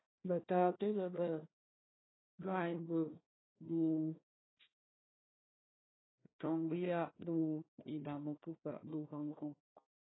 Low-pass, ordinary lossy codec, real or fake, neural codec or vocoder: 7.2 kHz; AAC, 16 kbps; fake; codec, 16 kHz, 1 kbps, FunCodec, trained on Chinese and English, 50 frames a second